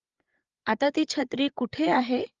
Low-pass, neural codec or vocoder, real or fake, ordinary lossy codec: 7.2 kHz; codec, 16 kHz, 8 kbps, FreqCodec, larger model; fake; Opus, 32 kbps